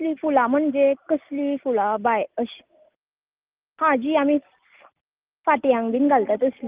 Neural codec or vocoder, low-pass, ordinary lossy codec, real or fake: none; 3.6 kHz; Opus, 32 kbps; real